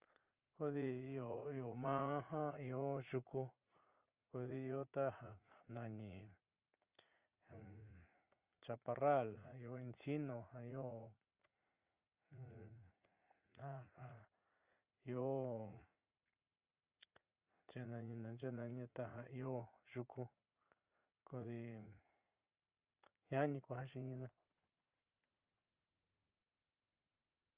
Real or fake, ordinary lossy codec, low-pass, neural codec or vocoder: fake; Opus, 24 kbps; 3.6 kHz; vocoder, 44.1 kHz, 80 mel bands, Vocos